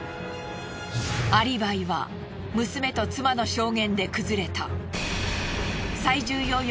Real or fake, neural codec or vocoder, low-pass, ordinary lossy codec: real; none; none; none